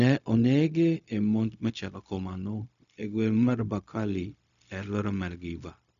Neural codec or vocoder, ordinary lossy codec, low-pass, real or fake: codec, 16 kHz, 0.4 kbps, LongCat-Audio-Codec; none; 7.2 kHz; fake